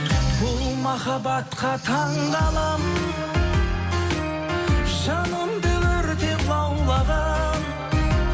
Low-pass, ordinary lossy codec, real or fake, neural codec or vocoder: none; none; real; none